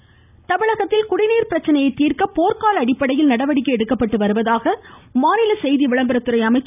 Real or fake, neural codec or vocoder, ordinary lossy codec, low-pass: real; none; none; 3.6 kHz